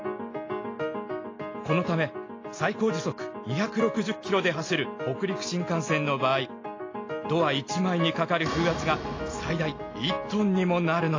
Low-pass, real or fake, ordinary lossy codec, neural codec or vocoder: 7.2 kHz; real; AAC, 32 kbps; none